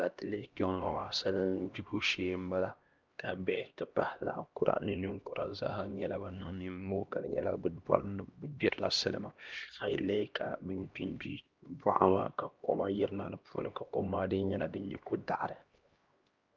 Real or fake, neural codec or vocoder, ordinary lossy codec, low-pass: fake; codec, 16 kHz, 1 kbps, X-Codec, HuBERT features, trained on LibriSpeech; Opus, 32 kbps; 7.2 kHz